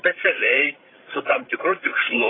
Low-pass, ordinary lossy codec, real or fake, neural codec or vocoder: 7.2 kHz; AAC, 16 kbps; fake; codec, 16 kHz, 16 kbps, FreqCodec, larger model